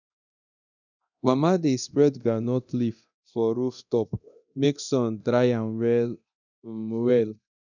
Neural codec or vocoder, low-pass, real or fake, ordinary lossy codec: codec, 24 kHz, 0.9 kbps, DualCodec; 7.2 kHz; fake; none